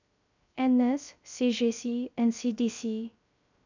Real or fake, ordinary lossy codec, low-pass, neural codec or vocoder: fake; none; 7.2 kHz; codec, 16 kHz, 0.3 kbps, FocalCodec